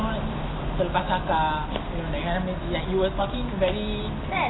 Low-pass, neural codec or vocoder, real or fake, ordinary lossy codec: 7.2 kHz; none; real; AAC, 16 kbps